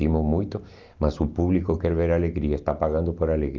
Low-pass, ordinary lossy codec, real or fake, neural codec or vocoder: 7.2 kHz; Opus, 24 kbps; real; none